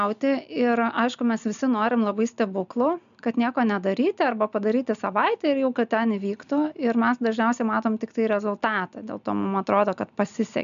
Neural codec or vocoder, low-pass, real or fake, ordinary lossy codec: none; 7.2 kHz; real; MP3, 96 kbps